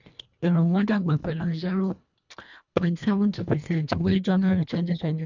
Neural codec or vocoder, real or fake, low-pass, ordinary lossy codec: codec, 24 kHz, 1.5 kbps, HILCodec; fake; 7.2 kHz; none